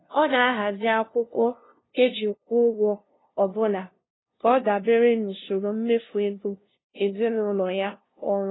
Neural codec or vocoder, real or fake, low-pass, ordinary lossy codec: codec, 16 kHz, 0.5 kbps, FunCodec, trained on LibriTTS, 25 frames a second; fake; 7.2 kHz; AAC, 16 kbps